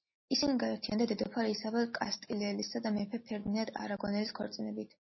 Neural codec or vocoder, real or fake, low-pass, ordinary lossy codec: none; real; 7.2 kHz; MP3, 24 kbps